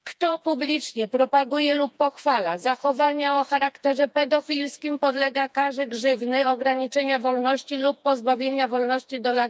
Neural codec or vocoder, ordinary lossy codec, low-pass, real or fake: codec, 16 kHz, 2 kbps, FreqCodec, smaller model; none; none; fake